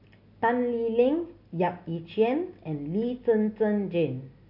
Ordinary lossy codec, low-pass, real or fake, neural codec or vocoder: none; 5.4 kHz; real; none